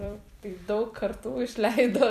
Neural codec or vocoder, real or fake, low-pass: vocoder, 44.1 kHz, 128 mel bands every 256 samples, BigVGAN v2; fake; 14.4 kHz